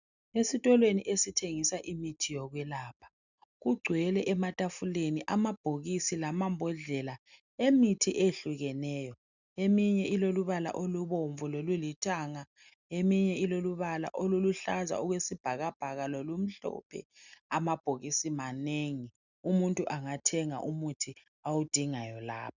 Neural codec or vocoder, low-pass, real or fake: none; 7.2 kHz; real